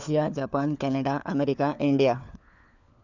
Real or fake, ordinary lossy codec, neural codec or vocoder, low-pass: fake; none; codec, 16 kHz, 4 kbps, FunCodec, trained on LibriTTS, 50 frames a second; 7.2 kHz